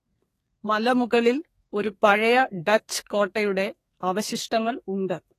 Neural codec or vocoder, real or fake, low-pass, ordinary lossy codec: codec, 32 kHz, 1.9 kbps, SNAC; fake; 14.4 kHz; AAC, 48 kbps